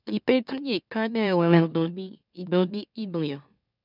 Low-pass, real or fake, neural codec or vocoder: 5.4 kHz; fake; autoencoder, 44.1 kHz, a latent of 192 numbers a frame, MeloTTS